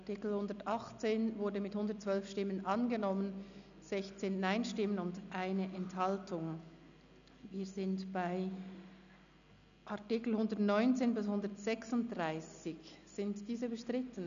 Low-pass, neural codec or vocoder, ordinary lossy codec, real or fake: 7.2 kHz; none; none; real